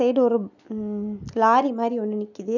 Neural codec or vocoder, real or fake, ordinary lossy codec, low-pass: none; real; none; 7.2 kHz